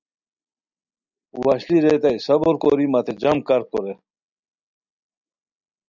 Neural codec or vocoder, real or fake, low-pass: none; real; 7.2 kHz